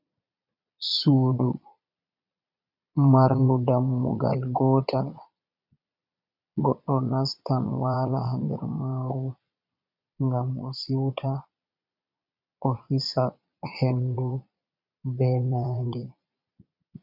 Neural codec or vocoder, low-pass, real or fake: vocoder, 22.05 kHz, 80 mel bands, Vocos; 5.4 kHz; fake